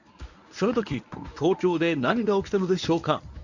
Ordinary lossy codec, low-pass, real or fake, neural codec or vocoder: none; 7.2 kHz; fake; codec, 24 kHz, 0.9 kbps, WavTokenizer, medium speech release version 1